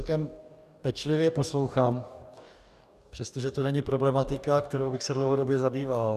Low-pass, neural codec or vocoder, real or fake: 14.4 kHz; codec, 44.1 kHz, 2.6 kbps, DAC; fake